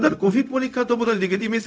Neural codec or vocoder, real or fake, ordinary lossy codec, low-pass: codec, 16 kHz, 0.4 kbps, LongCat-Audio-Codec; fake; none; none